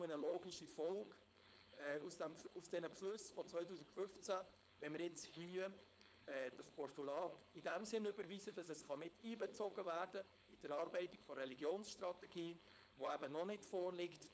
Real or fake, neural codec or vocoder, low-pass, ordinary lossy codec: fake; codec, 16 kHz, 4.8 kbps, FACodec; none; none